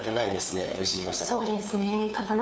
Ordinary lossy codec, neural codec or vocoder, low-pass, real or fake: none; codec, 16 kHz, 2 kbps, FunCodec, trained on LibriTTS, 25 frames a second; none; fake